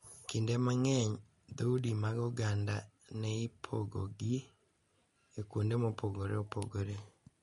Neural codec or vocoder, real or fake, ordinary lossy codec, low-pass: none; real; MP3, 48 kbps; 19.8 kHz